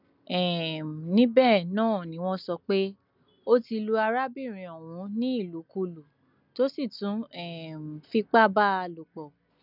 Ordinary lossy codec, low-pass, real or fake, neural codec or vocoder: none; 5.4 kHz; real; none